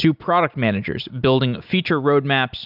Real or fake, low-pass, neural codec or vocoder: real; 5.4 kHz; none